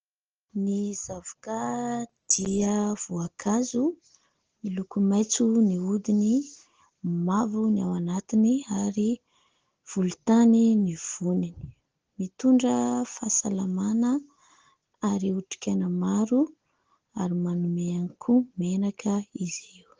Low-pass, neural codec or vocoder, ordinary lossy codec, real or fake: 7.2 kHz; none; Opus, 16 kbps; real